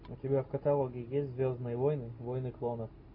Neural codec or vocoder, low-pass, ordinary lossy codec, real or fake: none; 5.4 kHz; AAC, 32 kbps; real